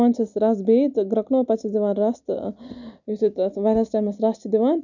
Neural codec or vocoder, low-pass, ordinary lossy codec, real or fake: none; 7.2 kHz; none; real